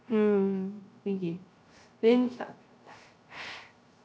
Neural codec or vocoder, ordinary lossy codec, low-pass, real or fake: codec, 16 kHz, 0.3 kbps, FocalCodec; none; none; fake